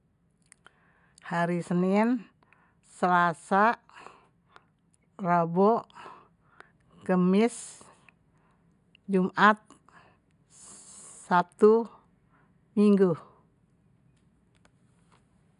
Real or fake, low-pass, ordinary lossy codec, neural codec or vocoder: real; 10.8 kHz; AAC, 96 kbps; none